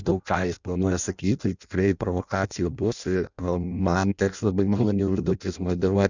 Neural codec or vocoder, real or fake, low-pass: codec, 16 kHz in and 24 kHz out, 0.6 kbps, FireRedTTS-2 codec; fake; 7.2 kHz